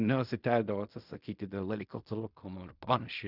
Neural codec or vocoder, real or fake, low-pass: codec, 16 kHz in and 24 kHz out, 0.4 kbps, LongCat-Audio-Codec, fine tuned four codebook decoder; fake; 5.4 kHz